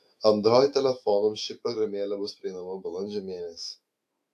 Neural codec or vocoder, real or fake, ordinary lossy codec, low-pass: autoencoder, 48 kHz, 128 numbers a frame, DAC-VAE, trained on Japanese speech; fake; AAC, 64 kbps; 14.4 kHz